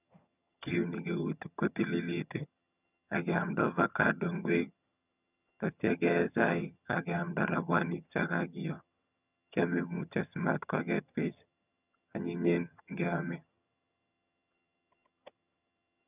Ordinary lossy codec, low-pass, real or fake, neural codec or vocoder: none; 3.6 kHz; fake; vocoder, 22.05 kHz, 80 mel bands, HiFi-GAN